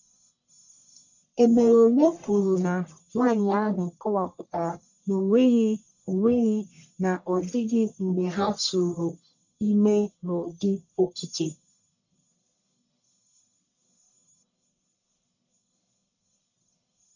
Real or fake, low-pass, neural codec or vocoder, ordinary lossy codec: fake; 7.2 kHz; codec, 44.1 kHz, 1.7 kbps, Pupu-Codec; none